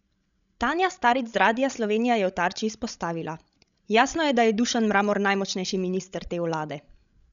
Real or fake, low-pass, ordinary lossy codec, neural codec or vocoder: fake; 7.2 kHz; none; codec, 16 kHz, 16 kbps, FreqCodec, larger model